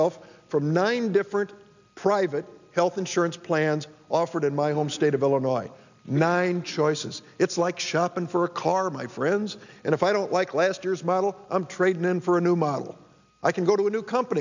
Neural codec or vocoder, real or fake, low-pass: none; real; 7.2 kHz